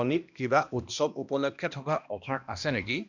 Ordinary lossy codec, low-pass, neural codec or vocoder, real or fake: none; 7.2 kHz; codec, 16 kHz, 1 kbps, X-Codec, HuBERT features, trained on balanced general audio; fake